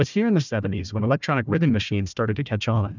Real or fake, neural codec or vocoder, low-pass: fake; codec, 16 kHz, 1 kbps, FunCodec, trained on Chinese and English, 50 frames a second; 7.2 kHz